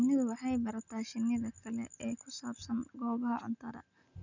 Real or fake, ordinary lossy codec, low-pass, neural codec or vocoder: real; none; 7.2 kHz; none